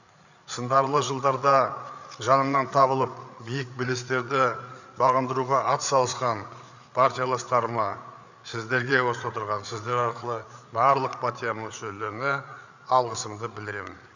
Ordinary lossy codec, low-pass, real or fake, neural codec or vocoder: none; 7.2 kHz; fake; codec, 16 kHz, 8 kbps, FreqCodec, larger model